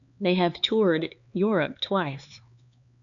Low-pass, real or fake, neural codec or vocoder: 7.2 kHz; fake; codec, 16 kHz, 4 kbps, X-Codec, HuBERT features, trained on LibriSpeech